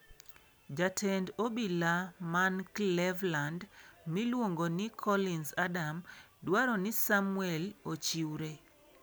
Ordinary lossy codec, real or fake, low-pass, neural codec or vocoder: none; real; none; none